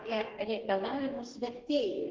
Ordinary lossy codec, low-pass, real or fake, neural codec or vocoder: Opus, 16 kbps; 7.2 kHz; fake; codec, 44.1 kHz, 2.6 kbps, DAC